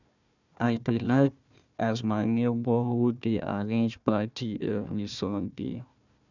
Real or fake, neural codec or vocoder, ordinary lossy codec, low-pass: fake; codec, 16 kHz, 1 kbps, FunCodec, trained on Chinese and English, 50 frames a second; none; 7.2 kHz